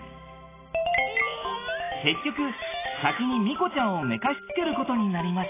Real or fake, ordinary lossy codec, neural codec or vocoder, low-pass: real; AAC, 16 kbps; none; 3.6 kHz